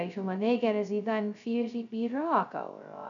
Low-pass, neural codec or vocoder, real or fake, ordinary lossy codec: 7.2 kHz; codec, 16 kHz, 0.2 kbps, FocalCodec; fake; none